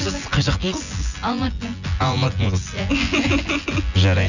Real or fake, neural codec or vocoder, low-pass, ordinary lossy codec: fake; vocoder, 24 kHz, 100 mel bands, Vocos; 7.2 kHz; none